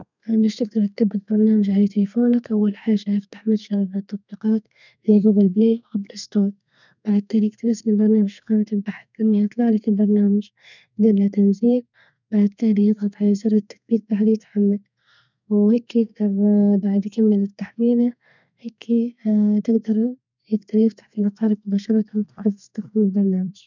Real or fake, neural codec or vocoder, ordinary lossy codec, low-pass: fake; codec, 32 kHz, 1.9 kbps, SNAC; none; 7.2 kHz